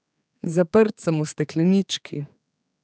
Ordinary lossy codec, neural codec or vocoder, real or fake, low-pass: none; codec, 16 kHz, 4 kbps, X-Codec, HuBERT features, trained on general audio; fake; none